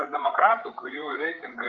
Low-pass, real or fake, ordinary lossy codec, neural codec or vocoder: 7.2 kHz; fake; Opus, 24 kbps; codec, 16 kHz, 8 kbps, FreqCodec, larger model